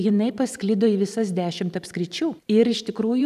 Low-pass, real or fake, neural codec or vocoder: 14.4 kHz; fake; vocoder, 44.1 kHz, 128 mel bands every 512 samples, BigVGAN v2